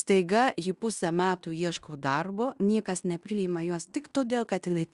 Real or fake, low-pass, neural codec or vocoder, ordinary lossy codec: fake; 10.8 kHz; codec, 16 kHz in and 24 kHz out, 0.9 kbps, LongCat-Audio-Codec, fine tuned four codebook decoder; Opus, 64 kbps